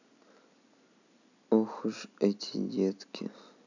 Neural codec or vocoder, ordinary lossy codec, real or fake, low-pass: none; none; real; 7.2 kHz